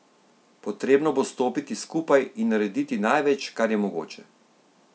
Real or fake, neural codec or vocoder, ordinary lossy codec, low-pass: real; none; none; none